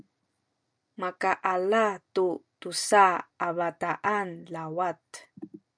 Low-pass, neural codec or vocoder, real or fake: 9.9 kHz; none; real